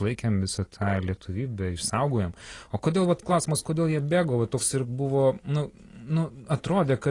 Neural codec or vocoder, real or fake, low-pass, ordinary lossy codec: none; real; 10.8 kHz; AAC, 32 kbps